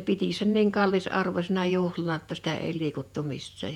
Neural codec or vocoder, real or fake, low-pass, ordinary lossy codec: vocoder, 48 kHz, 128 mel bands, Vocos; fake; 19.8 kHz; none